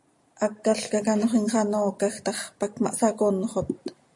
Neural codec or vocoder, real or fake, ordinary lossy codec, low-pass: none; real; MP3, 48 kbps; 10.8 kHz